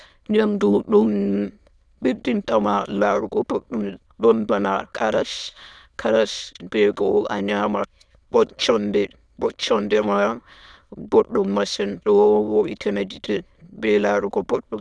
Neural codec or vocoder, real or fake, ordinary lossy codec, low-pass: autoencoder, 22.05 kHz, a latent of 192 numbers a frame, VITS, trained on many speakers; fake; none; none